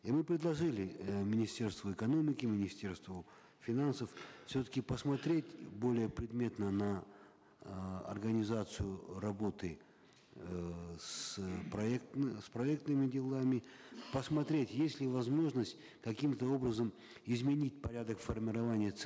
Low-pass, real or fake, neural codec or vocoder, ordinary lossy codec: none; real; none; none